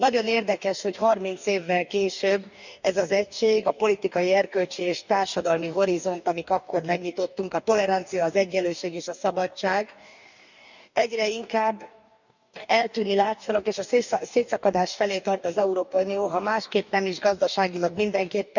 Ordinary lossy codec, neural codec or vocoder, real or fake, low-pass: none; codec, 44.1 kHz, 2.6 kbps, DAC; fake; 7.2 kHz